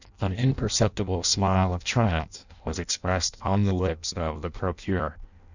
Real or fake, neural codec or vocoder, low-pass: fake; codec, 16 kHz in and 24 kHz out, 0.6 kbps, FireRedTTS-2 codec; 7.2 kHz